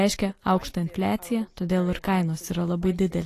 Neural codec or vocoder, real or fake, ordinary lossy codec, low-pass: none; real; AAC, 48 kbps; 14.4 kHz